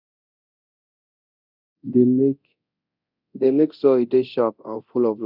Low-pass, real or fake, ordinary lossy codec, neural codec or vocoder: 5.4 kHz; fake; none; codec, 24 kHz, 0.9 kbps, DualCodec